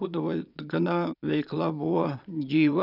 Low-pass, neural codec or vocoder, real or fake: 5.4 kHz; vocoder, 22.05 kHz, 80 mel bands, Vocos; fake